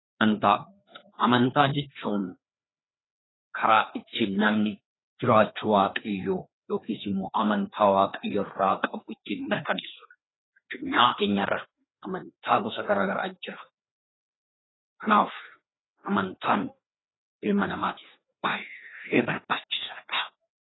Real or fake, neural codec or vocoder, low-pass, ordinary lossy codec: fake; codec, 16 kHz, 2 kbps, FreqCodec, larger model; 7.2 kHz; AAC, 16 kbps